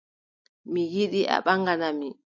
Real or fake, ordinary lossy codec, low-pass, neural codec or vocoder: real; MP3, 48 kbps; 7.2 kHz; none